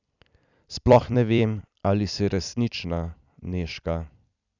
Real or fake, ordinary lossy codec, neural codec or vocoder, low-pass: fake; none; vocoder, 44.1 kHz, 128 mel bands every 256 samples, BigVGAN v2; 7.2 kHz